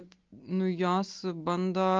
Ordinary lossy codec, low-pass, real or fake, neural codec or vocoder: Opus, 24 kbps; 7.2 kHz; real; none